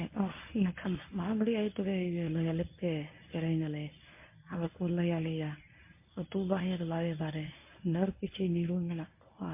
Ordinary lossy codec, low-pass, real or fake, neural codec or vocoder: MP3, 24 kbps; 3.6 kHz; fake; codec, 24 kHz, 0.9 kbps, WavTokenizer, medium speech release version 1